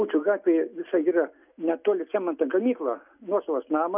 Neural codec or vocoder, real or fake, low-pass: none; real; 3.6 kHz